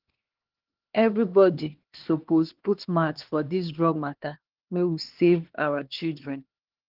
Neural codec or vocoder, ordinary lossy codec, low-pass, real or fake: codec, 16 kHz, 2 kbps, X-Codec, HuBERT features, trained on LibriSpeech; Opus, 16 kbps; 5.4 kHz; fake